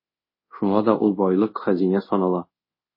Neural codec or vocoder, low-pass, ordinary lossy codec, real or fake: codec, 24 kHz, 0.5 kbps, DualCodec; 5.4 kHz; MP3, 24 kbps; fake